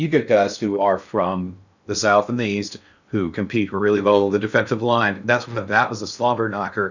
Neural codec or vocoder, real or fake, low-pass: codec, 16 kHz in and 24 kHz out, 0.6 kbps, FocalCodec, streaming, 2048 codes; fake; 7.2 kHz